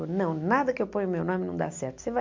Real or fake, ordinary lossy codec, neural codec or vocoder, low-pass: real; MP3, 48 kbps; none; 7.2 kHz